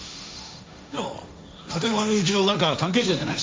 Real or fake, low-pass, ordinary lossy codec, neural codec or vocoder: fake; none; none; codec, 16 kHz, 1.1 kbps, Voila-Tokenizer